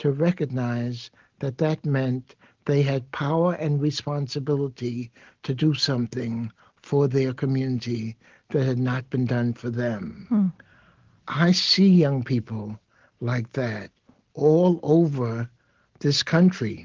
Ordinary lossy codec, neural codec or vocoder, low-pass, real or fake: Opus, 16 kbps; none; 7.2 kHz; real